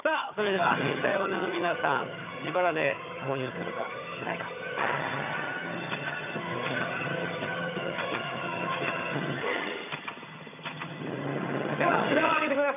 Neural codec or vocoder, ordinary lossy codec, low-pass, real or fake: vocoder, 22.05 kHz, 80 mel bands, HiFi-GAN; none; 3.6 kHz; fake